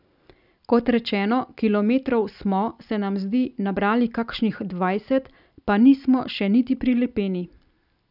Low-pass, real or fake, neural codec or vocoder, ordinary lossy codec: 5.4 kHz; real; none; none